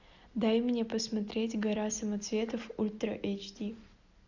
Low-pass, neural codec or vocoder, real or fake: 7.2 kHz; none; real